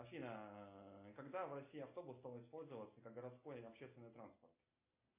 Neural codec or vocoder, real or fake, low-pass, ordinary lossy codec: none; real; 3.6 kHz; MP3, 24 kbps